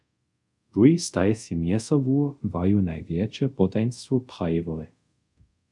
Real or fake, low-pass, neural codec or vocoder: fake; 10.8 kHz; codec, 24 kHz, 0.5 kbps, DualCodec